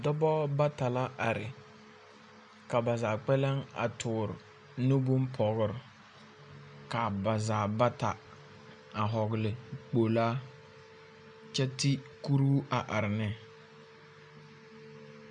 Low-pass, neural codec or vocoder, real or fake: 9.9 kHz; none; real